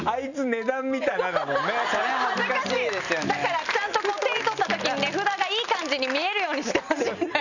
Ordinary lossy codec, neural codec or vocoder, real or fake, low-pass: none; none; real; 7.2 kHz